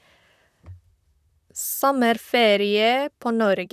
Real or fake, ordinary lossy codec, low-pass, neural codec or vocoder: fake; none; 14.4 kHz; vocoder, 44.1 kHz, 128 mel bands, Pupu-Vocoder